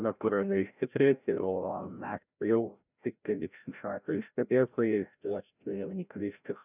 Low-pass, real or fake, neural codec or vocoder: 3.6 kHz; fake; codec, 16 kHz, 0.5 kbps, FreqCodec, larger model